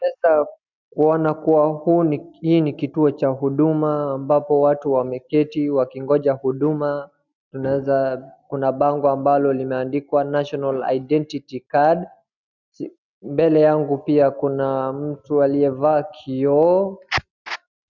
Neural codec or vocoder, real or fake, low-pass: none; real; 7.2 kHz